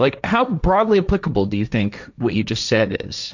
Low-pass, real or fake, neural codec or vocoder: 7.2 kHz; fake; codec, 16 kHz, 1.1 kbps, Voila-Tokenizer